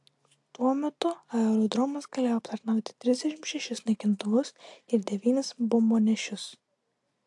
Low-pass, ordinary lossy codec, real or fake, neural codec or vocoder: 10.8 kHz; AAC, 64 kbps; real; none